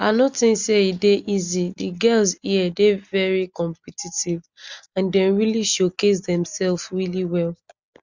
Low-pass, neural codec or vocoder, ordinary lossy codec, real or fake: 7.2 kHz; none; Opus, 64 kbps; real